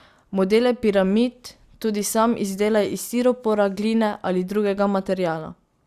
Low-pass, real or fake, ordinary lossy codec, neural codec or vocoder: 14.4 kHz; real; Opus, 64 kbps; none